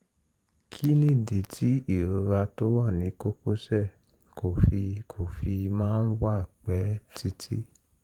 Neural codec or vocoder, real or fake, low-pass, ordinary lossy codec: vocoder, 44.1 kHz, 128 mel bands every 512 samples, BigVGAN v2; fake; 19.8 kHz; Opus, 32 kbps